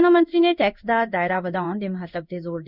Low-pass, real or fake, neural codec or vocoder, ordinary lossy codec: 5.4 kHz; fake; codec, 16 kHz in and 24 kHz out, 1 kbps, XY-Tokenizer; none